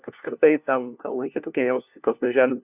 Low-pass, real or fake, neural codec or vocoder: 3.6 kHz; fake; codec, 16 kHz, 1 kbps, FunCodec, trained on LibriTTS, 50 frames a second